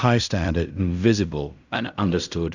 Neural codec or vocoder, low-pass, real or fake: codec, 16 kHz in and 24 kHz out, 0.4 kbps, LongCat-Audio-Codec, fine tuned four codebook decoder; 7.2 kHz; fake